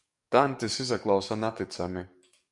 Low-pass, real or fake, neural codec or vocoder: 10.8 kHz; fake; codec, 44.1 kHz, 7.8 kbps, DAC